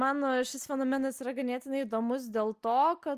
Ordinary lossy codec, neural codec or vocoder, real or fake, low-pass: Opus, 32 kbps; none; real; 14.4 kHz